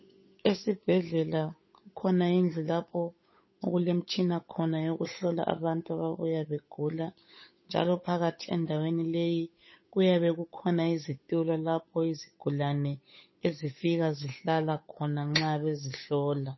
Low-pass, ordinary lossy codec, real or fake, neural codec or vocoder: 7.2 kHz; MP3, 24 kbps; fake; codec, 16 kHz, 8 kbps, FunCodec, trained on Chinese and English, 25 frames a second